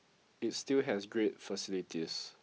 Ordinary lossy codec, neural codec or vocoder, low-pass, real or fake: none; none; none; real